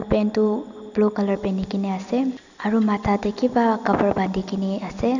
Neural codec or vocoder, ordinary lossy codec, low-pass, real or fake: none; none; 7.2 kHz; real